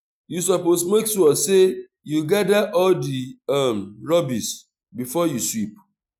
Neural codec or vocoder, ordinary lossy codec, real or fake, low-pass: none; none; real; 19.8 kHz